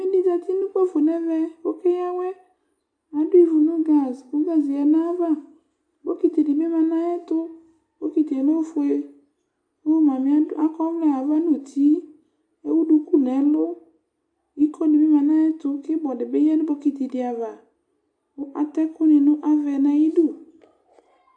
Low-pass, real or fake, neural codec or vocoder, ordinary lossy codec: 9.9 kHz; real; none; AAC, 64 kbps